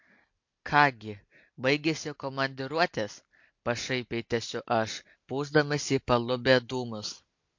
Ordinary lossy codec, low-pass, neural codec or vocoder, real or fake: MP3, 48 kbps; 7.2 kHz; none; real